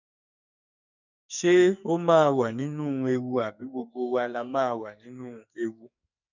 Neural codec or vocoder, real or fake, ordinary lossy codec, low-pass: codec, 44.1 kHz, 2.6 kbps, SNAC; fake; none; 7.2 kHz